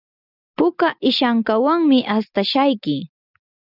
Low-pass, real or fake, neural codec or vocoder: 5.4 kHz; real; none